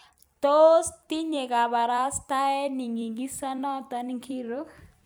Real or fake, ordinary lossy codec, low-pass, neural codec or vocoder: fake; none; none; vocoder, 44.1 kHz, 128 mel bands every 256 samples, BigVGAN v2